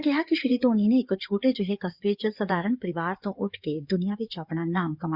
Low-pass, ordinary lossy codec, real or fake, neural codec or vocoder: 5.4 kHz; Opus, 64 kbps; fake; codec, 16 kHz in and 24 kHz out, 2.2 kbps, FireRedTTS-2 codec